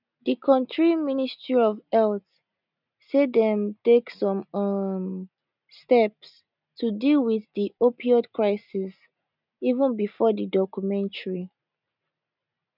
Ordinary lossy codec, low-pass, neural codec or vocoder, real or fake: none; 5.4 kHz; none; real